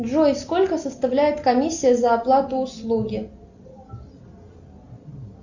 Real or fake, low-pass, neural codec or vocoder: real; 7.2 kHz; none